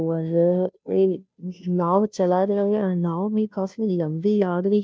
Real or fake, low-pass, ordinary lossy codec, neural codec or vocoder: fake; none; none; codec, 16 kHz, 0.5 kbps, FunCodec, trained on Chinese and English, 25 frames a second